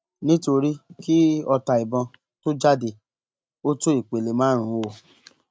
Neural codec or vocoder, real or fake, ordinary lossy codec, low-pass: none; real; none; none